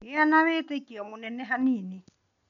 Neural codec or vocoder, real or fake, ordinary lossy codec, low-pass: none; real; none; 7.2 kHz